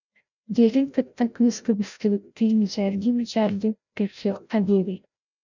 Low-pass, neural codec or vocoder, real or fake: 7.2 kHz; codec, 16 kHz, 0.5 kbps, FreqCodec, larger model; fake